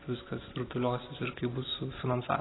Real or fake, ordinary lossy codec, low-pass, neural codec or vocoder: real; AAC, 16 kbps; 7.2 kHz; none